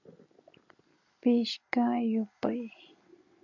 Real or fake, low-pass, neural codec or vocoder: real; 7.2 kHz; none